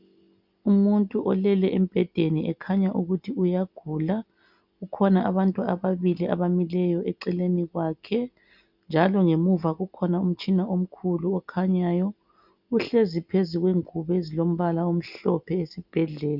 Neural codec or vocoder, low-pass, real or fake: none; 5.4 kHz; real